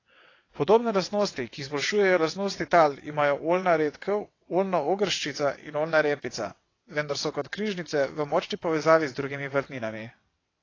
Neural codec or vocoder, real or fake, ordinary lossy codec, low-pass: vocoder, 22.05 kHz, 80 mel bands, WaveNeXt; fake; AAC, 32 kbps; 7.2 kHz